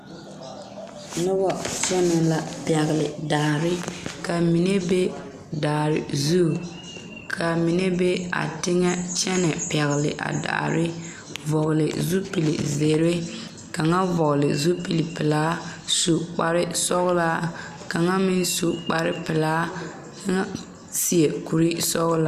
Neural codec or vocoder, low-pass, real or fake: none; 14.4 kHz; real